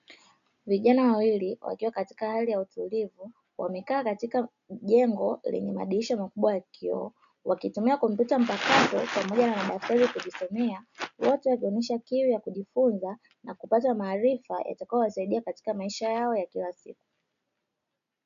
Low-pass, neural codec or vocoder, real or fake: 7.2 kHz; none; real